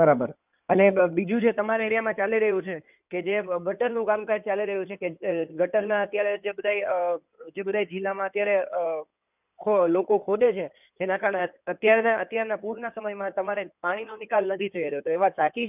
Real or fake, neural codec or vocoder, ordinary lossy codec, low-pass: fake; codec, 16 kHz in and 24 kHz out, 2.2 kbps, FireRedTTS-2 codec; none; 3.6 kHz